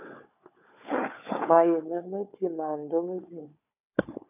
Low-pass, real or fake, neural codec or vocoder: 3.6 kHz; fake; codec, 16 kHz, 4 kbps, FunCodec, trained on Chinese and English, 50 frames a second